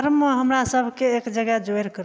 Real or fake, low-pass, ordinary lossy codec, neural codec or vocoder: real; none; none; none